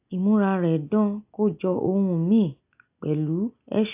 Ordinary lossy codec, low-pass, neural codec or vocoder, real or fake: AAC, 32 kbps; 3.6 kHz; none; real